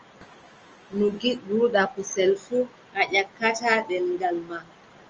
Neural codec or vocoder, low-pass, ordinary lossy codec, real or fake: none; 7.2 kHz; Opus, 24 kbps; real